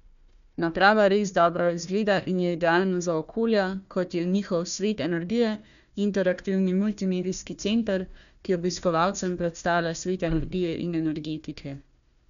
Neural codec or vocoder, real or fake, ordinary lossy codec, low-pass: codec, 16 kHz, 1 kbps, FunCodec, trained on Chinese and English, 50 frames a second; fake; none; 7.2 kHz